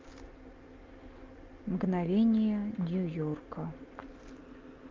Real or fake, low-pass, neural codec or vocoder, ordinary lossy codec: real; 7.2 kHz; none; Opus, 24 kbps